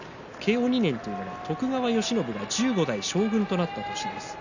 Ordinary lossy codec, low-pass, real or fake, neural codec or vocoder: none; 7.2 kHz; real; none